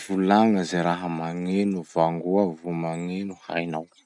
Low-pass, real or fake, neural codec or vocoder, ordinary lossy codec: 10.8 kHz; real; none; none